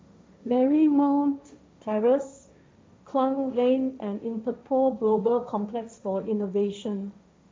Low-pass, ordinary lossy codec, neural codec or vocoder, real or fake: none; none; codec, 16 kHz, 1.1 kbps, Voila-Tokenizer; fake